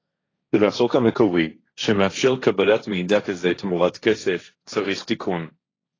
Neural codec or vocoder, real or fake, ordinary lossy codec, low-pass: codec, 16 kHz, 1.1 kbps, Voila-Tokenizer; fake; AAC, 32 kbps; 7.2 kHz